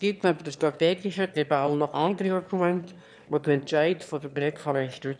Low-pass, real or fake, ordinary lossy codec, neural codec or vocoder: none; fake; none; autoencoder, 22.05 kHz, a latent of 192 numbers a frame, VITS, trained on one speaker